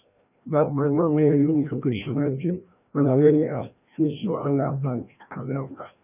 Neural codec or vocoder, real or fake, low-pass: codec, 16 kHz, 1 kbps, FreqCodec, larger model; fake; 3.6 kHz